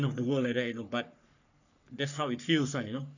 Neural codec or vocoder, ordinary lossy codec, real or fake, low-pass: codec, 44.1 kHz, 3.4 kbps, Pupu-Codec; none; fake; 7.2 kHz